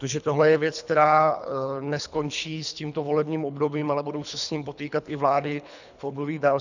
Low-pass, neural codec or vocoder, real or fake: 7.2 kHz; codec, 24 kHz, 3 kbps, HILCodec; fake